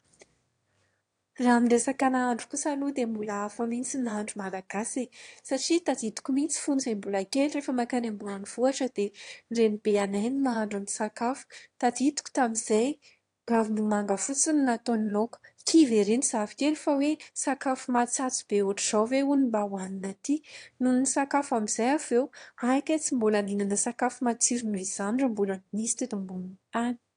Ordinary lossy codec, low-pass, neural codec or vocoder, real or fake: AAC, 48 kbps; 9.9 kHz; autoencoder, 22.05 kHz, a latent of 192 numbers a frame, VITS, trained on one speaker; fake